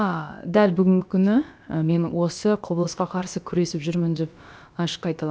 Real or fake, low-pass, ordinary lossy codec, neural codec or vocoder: fake; none; none; codec, 16 kHz, about 1 kbps, DyCAST, with the encoder's durations